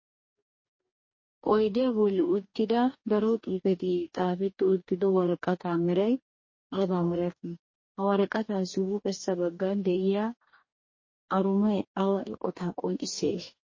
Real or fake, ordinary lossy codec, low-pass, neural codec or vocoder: fake; MP3, 32 kbps; 7.2 kHz; codec, 44.1 kHz, 2.6 kbps, DAC